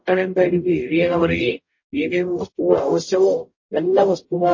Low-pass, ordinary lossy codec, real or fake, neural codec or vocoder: 7.2 kHz; MP3, 32 kbps; fake; codec, 44.1 kHz, 0.9 kbps, DAC